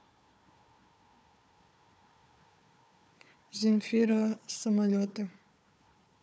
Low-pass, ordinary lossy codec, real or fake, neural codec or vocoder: none; none; fake; codec, 16 kHz, 4 kbps, FunCodec, trained on Chinese and English, 50 frames a second